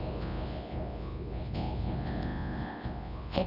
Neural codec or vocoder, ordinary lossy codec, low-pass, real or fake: codec, 24 kHz, 0.9 kbps, WavTokenizer, large speech release; none; 5.4 kHz; fake